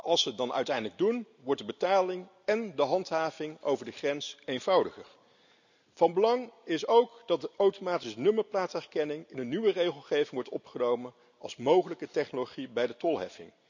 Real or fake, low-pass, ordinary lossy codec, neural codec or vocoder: real; 7.2 kHz; none; none